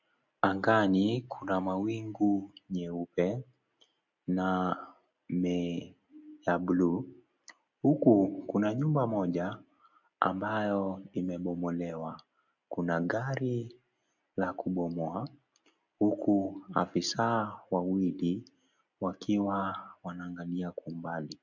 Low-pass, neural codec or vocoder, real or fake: 7.2 kHz; none; real